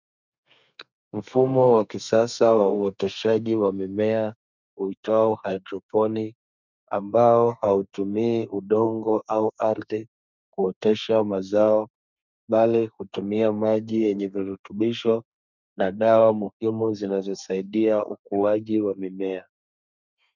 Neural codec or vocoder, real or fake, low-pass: codec, 32 kHz, 1.9 kbps, SNAC; fake; 7.2 kHz